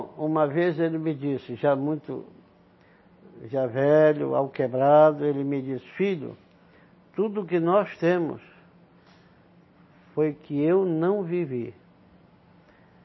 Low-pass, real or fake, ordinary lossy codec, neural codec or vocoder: 7.2 kHz; real; MP3, 24 kbps; none